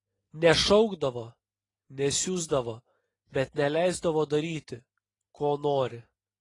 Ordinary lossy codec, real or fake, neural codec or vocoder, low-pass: AAC, 32 kbps; real; none; 10.8 kHz